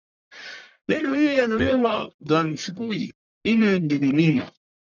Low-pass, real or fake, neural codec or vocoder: 7.2 kHz; fake; codec, 44.1 kHz, 1.7 kbps, Pupu-Codec